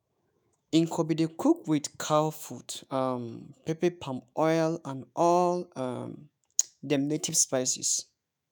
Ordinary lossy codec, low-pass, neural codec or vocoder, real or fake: none; none; autoencoder, 48 kHz, 128 numbers a frame, DAC-VAE, trained on Japanese speech; fake